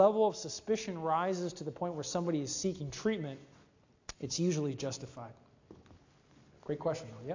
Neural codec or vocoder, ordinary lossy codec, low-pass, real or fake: none; AAC, 48 kbps; 7.2 kHz; real